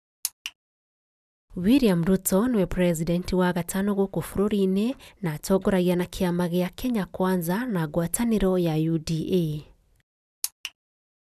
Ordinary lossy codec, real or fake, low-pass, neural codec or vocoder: none; real; 14.4 kHz; none